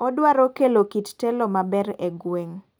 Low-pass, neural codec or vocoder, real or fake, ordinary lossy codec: none; none; real; none